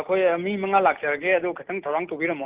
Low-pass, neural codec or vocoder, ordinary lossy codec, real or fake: 3.6 kHz; none; Opus, 16 kbps; real